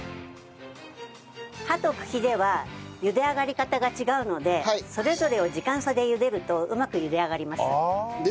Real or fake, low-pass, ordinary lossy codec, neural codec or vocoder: real; none; none; none